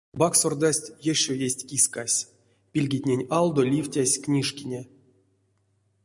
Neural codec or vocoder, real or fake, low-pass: none; real; 10.8 kHz